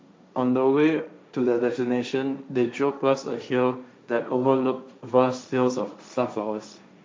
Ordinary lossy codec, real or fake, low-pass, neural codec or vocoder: none; fake; none; codec, 16 kHz, 1.1 kbps, Voila-Tokenizer